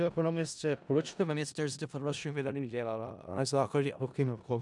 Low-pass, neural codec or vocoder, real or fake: 10.8 kHz; codec, 16 kHz in and 24 kHz out, 0.4 kbps, LongCat-Audio-Codec, four codebook decoder; fake